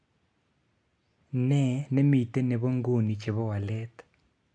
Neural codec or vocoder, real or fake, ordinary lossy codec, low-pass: none; real; AAC, 64 kbps; 9.9 kHz